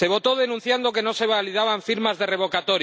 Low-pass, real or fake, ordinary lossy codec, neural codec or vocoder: none; real; none; none